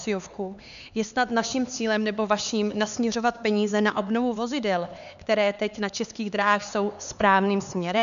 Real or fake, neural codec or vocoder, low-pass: fake; codec, 16 kHz, 4 kbps, X-Codec, HuBERT features, trained on LibriSpeech; 7.2 kHz